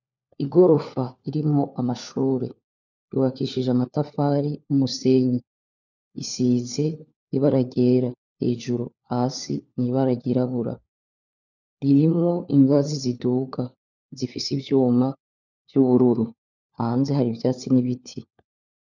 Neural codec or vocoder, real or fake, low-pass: codec, 16 kHz, 4 kbps, FunCodec, trained on LibriTTS, 50 frames a second; fake; 7.2 kHz